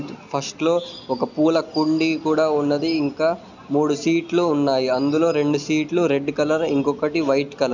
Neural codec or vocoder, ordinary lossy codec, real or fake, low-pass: none; none; real; 7.2 kHz